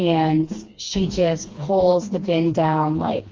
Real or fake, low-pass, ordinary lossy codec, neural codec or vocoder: fake; 7.2 kHz; Opus, 32 kbps; codec, 16 kHz, 2 kbps, FreqCodec, smaller model